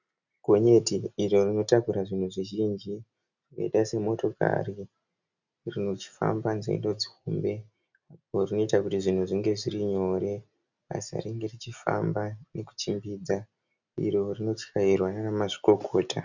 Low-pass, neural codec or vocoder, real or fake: 7.2 kHz; none; real